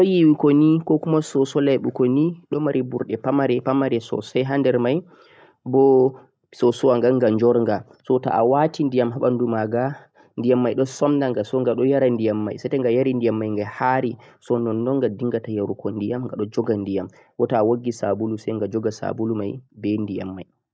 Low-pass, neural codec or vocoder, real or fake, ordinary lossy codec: none; none; real; none